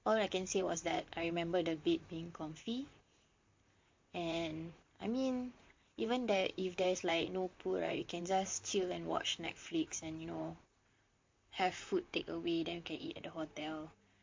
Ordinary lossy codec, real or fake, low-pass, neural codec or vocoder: MP3, 48 kbps; fake; 7.2 kHz; vocoder, 44.1 kHz, 128 mel bands, Pupu-Vocoder